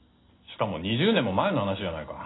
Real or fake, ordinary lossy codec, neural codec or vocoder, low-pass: real; AAC, 16 kbps; none; 7.2 kHz